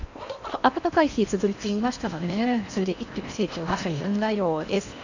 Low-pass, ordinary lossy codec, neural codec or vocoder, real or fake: 7.2 kHz; none; codec, 16 kHz in and 24 kHz out, 0.8 kbps, FocalCodec, streaming, 65536 codes; fake